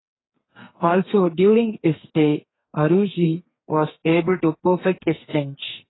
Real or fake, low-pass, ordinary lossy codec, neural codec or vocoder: fake; 7.2 kHz; AAC, 16 kbps; codec, 32 kHz, 1.9 kbps, SNAC